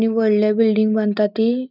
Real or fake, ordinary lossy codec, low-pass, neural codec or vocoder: real; none; 5.4 kHz; none